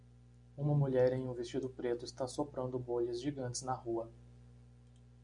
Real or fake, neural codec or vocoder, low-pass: real; none; 9.9 kHz